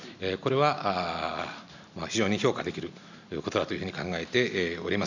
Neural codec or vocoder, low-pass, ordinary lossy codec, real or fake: none; 7.2 kHz; none; real